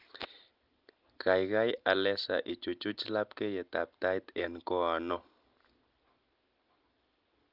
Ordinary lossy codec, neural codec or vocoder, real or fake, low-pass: Opus, 32 kbps; none; real; 5.4 kHz